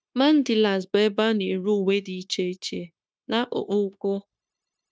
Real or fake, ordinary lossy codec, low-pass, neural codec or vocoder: fake; none; none; codec, 16 kHz, 0.9 kbps, LongCat-Audio-Codec